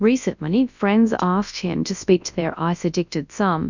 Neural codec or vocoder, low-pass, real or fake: codec, 24 kHz, 0.9 kbps, WavTokenizer, large speech release; 7.2 kHz; fake